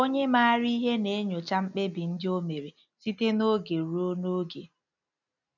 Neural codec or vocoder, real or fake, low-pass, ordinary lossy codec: none; real; 7.2 kHz; none